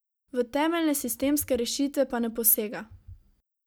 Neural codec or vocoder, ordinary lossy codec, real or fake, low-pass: none; none; real; none